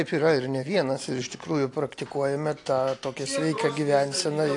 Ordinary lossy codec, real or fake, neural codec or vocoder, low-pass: AAC, 48 kbps; real; none; 10.8 kHz